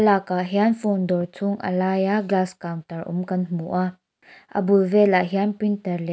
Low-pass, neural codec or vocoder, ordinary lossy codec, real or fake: none; none; none; real